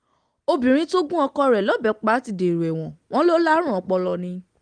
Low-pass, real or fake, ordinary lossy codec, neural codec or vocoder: 9.9 kHz; real; Opus, 24 kbps; none